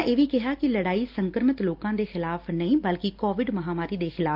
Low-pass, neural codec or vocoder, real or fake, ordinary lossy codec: 5.4 kHz; none; real; Opus, 32 kbps